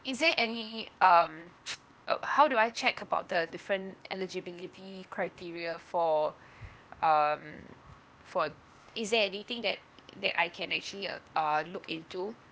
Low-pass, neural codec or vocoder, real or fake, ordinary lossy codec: none; codec, 16 kHz, 0.8 kbps, ZipCodec; fake; none